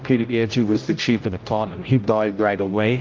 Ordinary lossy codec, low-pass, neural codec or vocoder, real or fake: Opus, 24 kbps; 7.2 kHz; codec, 16 kHz, 0.5 kbps, X-Codec, HuBERT features, trained on general audio; fake